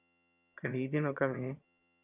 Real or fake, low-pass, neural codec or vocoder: fake; 3.6 kHz; vocoder, 22.05 kHz, 80 mel bands, HiFi-GAN